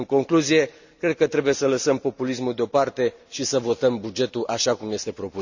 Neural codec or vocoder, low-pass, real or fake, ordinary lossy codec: none; 7.2 kHz; real; Opus, 64 kbps